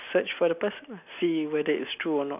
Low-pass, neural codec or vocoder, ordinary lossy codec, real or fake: 3.6 kHz; none; none; real